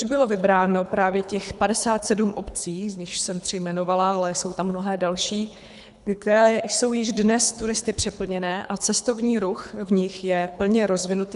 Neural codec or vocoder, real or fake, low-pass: codec, 24 kHz, 3 kbps, HILCodec; fake; 10.8 kHz